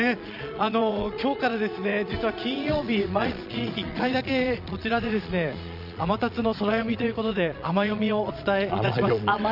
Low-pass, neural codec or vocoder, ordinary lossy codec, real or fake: 5.4 kHz; vocoder, 22.05 kHz, 80 mel bands, Vocos; none; fake